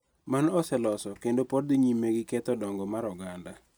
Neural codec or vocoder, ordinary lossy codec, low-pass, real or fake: none; none; none; real